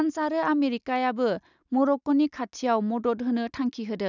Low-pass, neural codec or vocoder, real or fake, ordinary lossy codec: 7.2 kHz; none; real; none